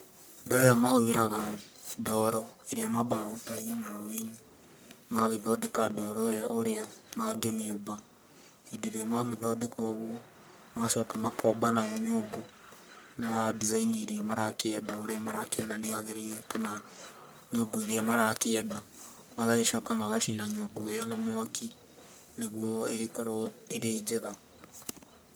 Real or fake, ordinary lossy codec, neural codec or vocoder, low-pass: fake; none; codec, 44.1 kHz, 1.7 kbps, Pupu-Codec; none